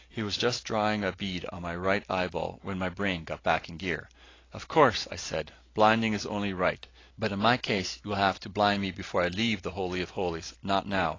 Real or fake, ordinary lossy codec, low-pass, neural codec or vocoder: real; AAC, 32 kbps; 7.2 kHz; none